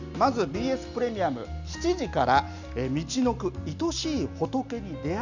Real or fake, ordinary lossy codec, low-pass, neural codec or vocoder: real; none; 7.2 kHz; none